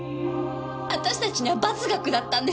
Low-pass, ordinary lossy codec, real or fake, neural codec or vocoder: none; none; real; none